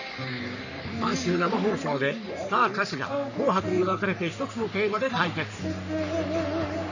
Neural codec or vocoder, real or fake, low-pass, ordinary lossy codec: codec, 44.1 kHz, 3.4 kbps, Pupu-Codec; fake; 7.2 kHz; none